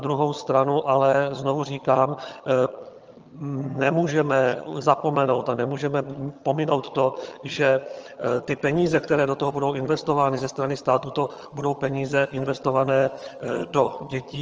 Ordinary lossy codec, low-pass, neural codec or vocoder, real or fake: Opus, 24 kbps; 7.2 kHz; vocoder, 22.05 kHz, 80 mel bands, HiFi-GAN; fake